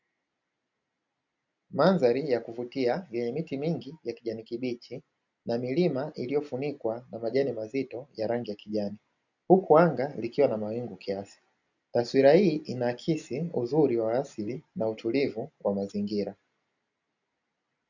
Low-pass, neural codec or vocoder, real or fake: 7.2 kHz; none; real